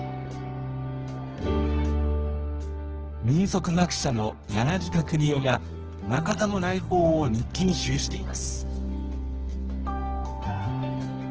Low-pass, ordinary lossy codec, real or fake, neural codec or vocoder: 7.2 kHz; Opus, 16 kbps; fake; codec, 24 kHz, 0.9 kbps, WavTokenizer, medium music audio release